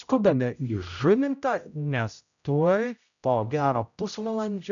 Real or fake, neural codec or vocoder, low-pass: fake; codec, 16 kHz, 0.5 kbps, X-Codec, HuBERT features, trained on general audio; 7.2 kHz